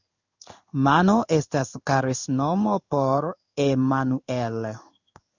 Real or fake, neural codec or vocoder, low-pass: fake; codec, 16 kHz in and 24 kHz out, 1 kbps, XY-Tokenizer; 7.2 kHz